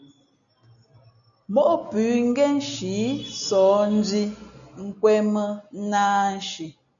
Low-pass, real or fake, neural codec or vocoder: 7.2 kHz; real; none